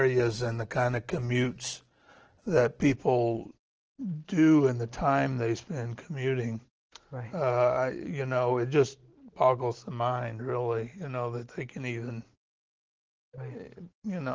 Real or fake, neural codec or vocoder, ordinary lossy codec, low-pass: real; none; Opus, 16 kbps; 7.2 kHz